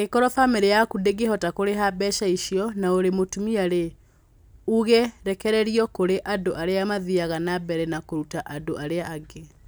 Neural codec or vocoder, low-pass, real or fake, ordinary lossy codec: none; none; real; none